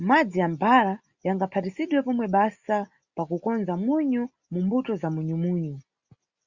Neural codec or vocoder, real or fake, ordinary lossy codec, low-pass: none; real; Opus, 64 kbps; 7.2 kHz